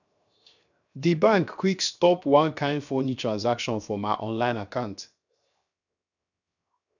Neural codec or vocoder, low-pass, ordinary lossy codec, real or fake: codec, 16 kHz, 0.7 kbps, FocalCodec; 7.2 kHz; none; fake